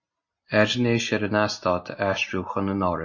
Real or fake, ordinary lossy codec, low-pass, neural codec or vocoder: real; MP3, 32 kbps; 7.2 kHz; none